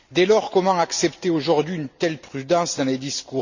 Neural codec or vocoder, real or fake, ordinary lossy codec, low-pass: none; real; none; 7.2 kHz